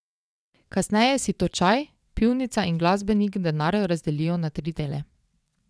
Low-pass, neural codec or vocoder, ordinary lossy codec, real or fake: none; vocoder, 22.05 kHz, 80 mel bands, WaveNeXt; none; fake